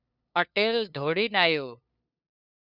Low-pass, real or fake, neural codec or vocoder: 5.4 kHz; fake; codec, 16 kHz, 2 kbps, FunCodec, trained on LibriTTS, 25 frames a second